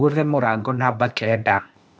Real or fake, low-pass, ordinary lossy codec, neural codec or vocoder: fake; none; none; codec, 16 kHz, 0.8 kbps, ZipCodec